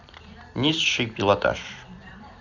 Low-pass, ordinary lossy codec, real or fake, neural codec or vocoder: 7.2 kHz; none; fake; vocoder, 44.1 kHz, 128 mel bands every 256 samples, BigVGAN v2